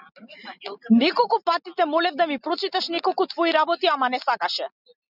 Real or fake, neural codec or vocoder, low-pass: real; none; 5.4 kHz